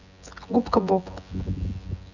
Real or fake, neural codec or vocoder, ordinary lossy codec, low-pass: fake; vocoder, 24 kHz, 100 mel bands, Vocos; none; 7.2 kHz